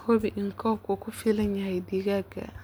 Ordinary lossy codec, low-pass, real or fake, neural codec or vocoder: none; none; real; none